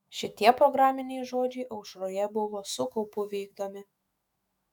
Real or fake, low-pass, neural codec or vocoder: fake; 19.8 kHz; autoencoder, 48 kHz, 128 numbers a frame, DAC-VAE, trained on Japanese speech